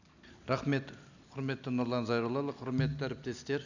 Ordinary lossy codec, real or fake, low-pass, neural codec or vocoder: none; real; 7.2 kHz; none